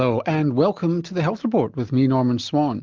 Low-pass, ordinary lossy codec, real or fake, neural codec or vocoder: 7.2 kHz; Opus, 32 kbps; real; none